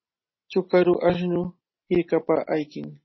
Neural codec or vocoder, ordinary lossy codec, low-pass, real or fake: none; MP3, 24 kbps; 7.2 kHz; real